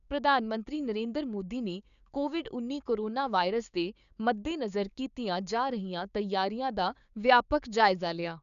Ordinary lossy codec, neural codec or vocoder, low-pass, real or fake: none; codec, 16 kHz, 6 kbps, DAC; 7.2 kHz; fake